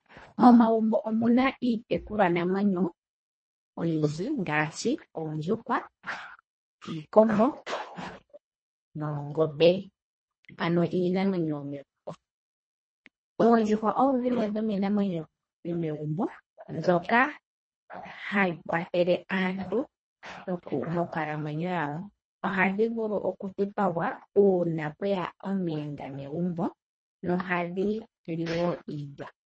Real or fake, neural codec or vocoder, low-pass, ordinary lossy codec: fake; codec, 24 kHz, 1.5 kbps, HILCodec; 9.9 kHz; MP3, 32 kbps